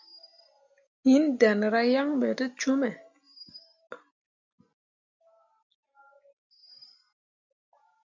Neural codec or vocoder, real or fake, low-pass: none; real; 7.2 kHz